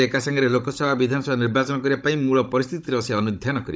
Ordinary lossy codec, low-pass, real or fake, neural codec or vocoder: none; none; fake; codec, 16 kHz, 16 kbps, FunCodec, trained on Chinese and English, 50 frames a second